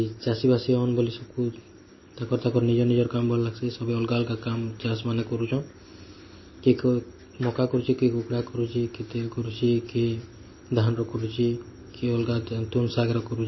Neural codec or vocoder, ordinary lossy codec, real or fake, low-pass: none; MP3, 24 kbps; real; 7.2 kHz